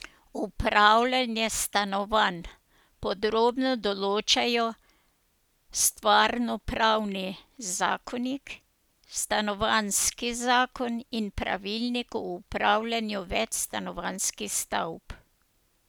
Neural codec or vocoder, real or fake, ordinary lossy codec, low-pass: none; real; none; none